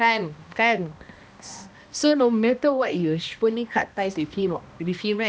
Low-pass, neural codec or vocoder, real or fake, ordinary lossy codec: none; codec, 16 kHz, 1 kbps, X-Codec, HuBERT features, trained on balanced general audio; fake; none